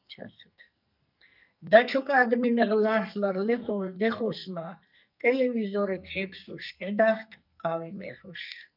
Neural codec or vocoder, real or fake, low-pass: codec, 44.1 kHz, 2.6 kbps, SNAC; fake; 5.4 kHz